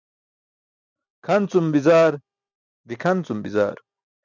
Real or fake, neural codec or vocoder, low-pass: real; none; 7.2 kHz